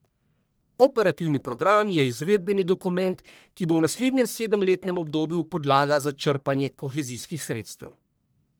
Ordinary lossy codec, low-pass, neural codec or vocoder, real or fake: none; none; codec, 44.1 kHz, 1.7 kbps, Pupu-Codec; fake